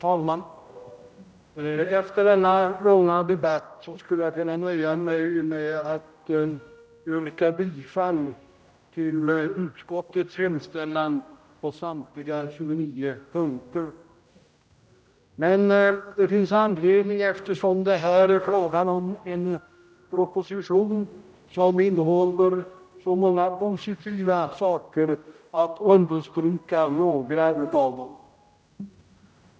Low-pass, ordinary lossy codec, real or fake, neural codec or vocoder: none; none; fake; codec, 16 kHz, 0.5 kbps, X-Codec, HuBERT features, trained on general audio